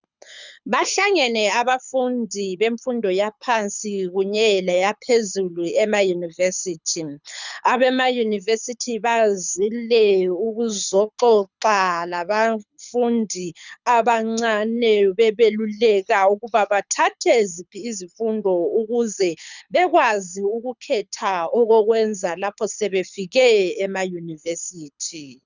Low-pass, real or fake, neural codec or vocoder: 7.2 kHz; fake; codec, 24 kHz, 6 kbps, HILCodec